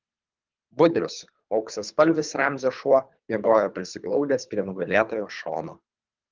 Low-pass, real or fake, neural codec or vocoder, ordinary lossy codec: 7.2 kHz; fake; codec, 24 kHz, 3 kbps, HILCodec; Opus, 24 kbps